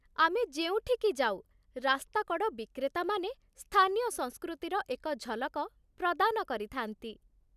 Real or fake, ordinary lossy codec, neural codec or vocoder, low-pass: real; none; none; 14.4 kHz